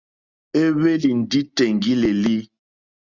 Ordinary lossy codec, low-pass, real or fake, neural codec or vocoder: Opus, 64 kbps; 7.2 kHz; real; none